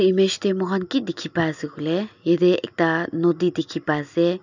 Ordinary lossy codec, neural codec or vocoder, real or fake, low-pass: none; none; real; 7.2 kHz